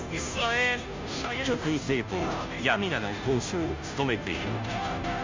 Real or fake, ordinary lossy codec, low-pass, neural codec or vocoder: fake; MP3, 64 kbps; 7.2 kHz; codec, 16 kHz, 0.5 kbps, FunCodec, trained on Chinese and English, 25 frames a second